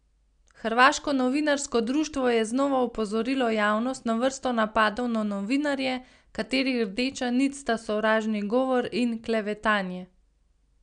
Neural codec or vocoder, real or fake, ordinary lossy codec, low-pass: none; real; none; 9.9 kHz